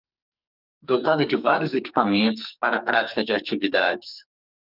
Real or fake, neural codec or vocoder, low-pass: fake; codec, 44.1 kHz, 2.6 kbps, SNAC; 5.4 kHz